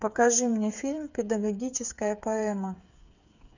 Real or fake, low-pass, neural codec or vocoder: fake; 7.2 kHz; codec, 16 kHz, 8 kbps, FreqCodec, smaller model